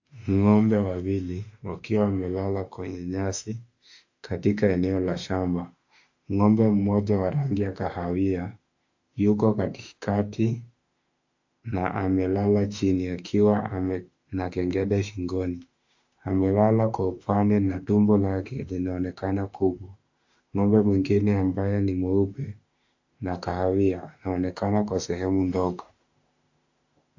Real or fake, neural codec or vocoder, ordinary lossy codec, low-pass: fake; autoencoder, 48 kHz, 32 numbers a frame, DAC-VAE, trained on Japanese speech; AAC, 48 kbps; 7.2 kHz